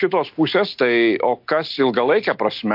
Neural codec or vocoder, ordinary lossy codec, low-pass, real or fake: none; MP3, 48 kbps; 5.4 kHz; real